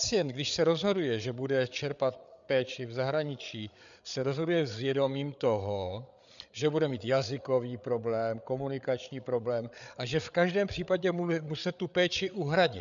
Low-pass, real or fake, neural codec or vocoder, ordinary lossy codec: 7.2 kHz; fake; codec, 16 kHz, 8 kbps, FreqCodec, larger model; MP3, 96 kbps